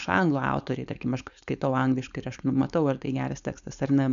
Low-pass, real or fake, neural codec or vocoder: 7.2 kHz; fake; codec, 16 kHz, 4.8 kbps, FACodec